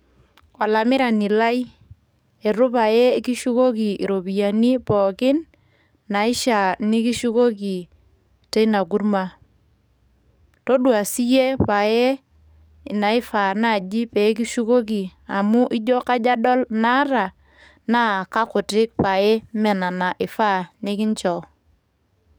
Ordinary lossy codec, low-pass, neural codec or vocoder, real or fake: none; none; codec, 44.1 kHz, 7.8 kbps, DAC; fake